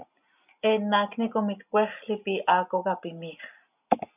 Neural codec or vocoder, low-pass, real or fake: none; 3.6 kHz; real